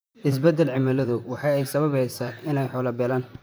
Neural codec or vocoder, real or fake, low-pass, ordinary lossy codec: vocoder, 44.1 kHz, 128 mel bands, Pupu-Vocoder; fake; none; none